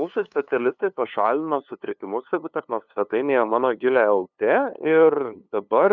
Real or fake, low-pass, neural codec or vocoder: fake; 7.2 kHz; codec, 16 kHz, 2 kbps, FunCodec, trained on LibriTTS, 25 frames a second